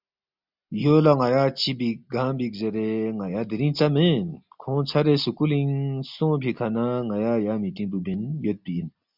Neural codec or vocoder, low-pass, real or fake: none; 5.4 kHz; real